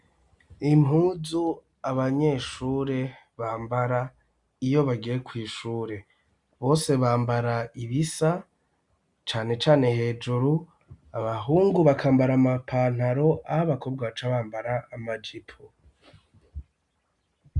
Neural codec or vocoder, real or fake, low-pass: none; real; 10.8 kHz